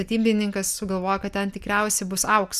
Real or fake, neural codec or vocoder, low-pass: real; none; 14.4 kHz